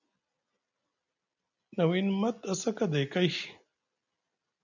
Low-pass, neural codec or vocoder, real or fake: 7.2 kHz; none; real